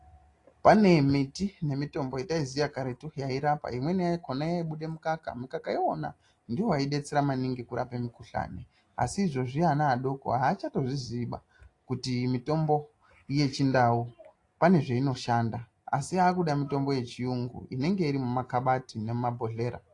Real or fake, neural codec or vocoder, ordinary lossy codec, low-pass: real; none; AAC, 48 kbps; 10.8 kHz